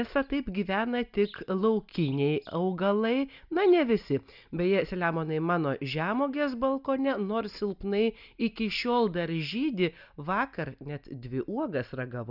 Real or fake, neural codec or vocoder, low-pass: real; none; 5.4 kHz